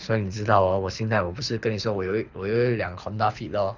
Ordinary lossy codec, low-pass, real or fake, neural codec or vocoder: none; 7.2 kHz; fake; codec, 24 kHz, 6 kbps, HILCodec